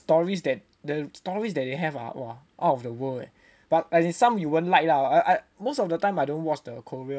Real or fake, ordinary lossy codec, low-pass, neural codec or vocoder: real; none; none; none